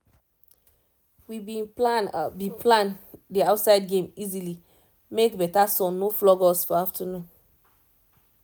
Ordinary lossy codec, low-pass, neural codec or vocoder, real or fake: none; none; none; real